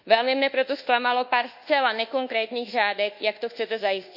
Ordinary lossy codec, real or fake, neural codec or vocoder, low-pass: none; fake; codec, 24 kHz, 1.2 kbps, DualCodec; 5.4 kHz